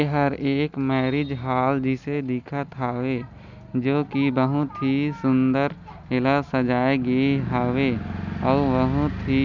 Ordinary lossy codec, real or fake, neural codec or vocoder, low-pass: none; real; none; 7.2 kHz